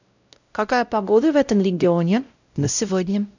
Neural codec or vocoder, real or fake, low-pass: codec, 16 kHz, 0.5 kbps, X-Codec, WavLM features, trained on Multilingual LibriSpeech; fake; 7.2 kHz